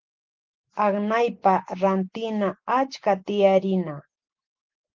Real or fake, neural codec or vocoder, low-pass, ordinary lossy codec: real; none; 7.2 kHz; Opus, 16 kbps